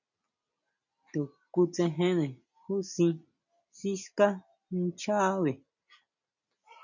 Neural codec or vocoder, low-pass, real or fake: none; 7.2 kHz; real